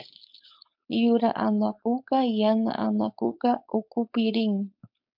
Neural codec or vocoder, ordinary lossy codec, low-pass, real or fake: codec, 16 kHz, 4.8 kbps, FACodec; MP3, 48 kbps; 5.4 kHz; fake